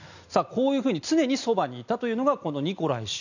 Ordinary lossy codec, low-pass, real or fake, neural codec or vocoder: none; 7.2 kHz; real; none